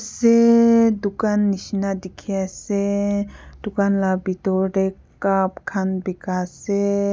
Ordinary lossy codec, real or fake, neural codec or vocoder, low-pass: none; real; none; none